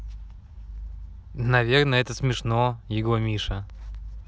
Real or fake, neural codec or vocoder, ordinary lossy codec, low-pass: real; none; none; none